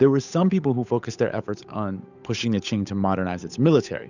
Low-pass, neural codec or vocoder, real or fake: 7.2 kHz; codec, 16 kHz, 8 kbps, FunCodec, trained on Chinese and English, 25 frames a second; fake